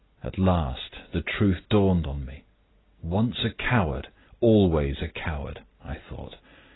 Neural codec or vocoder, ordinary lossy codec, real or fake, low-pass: none; AAC, 16 kbps; real; 7.2 kHz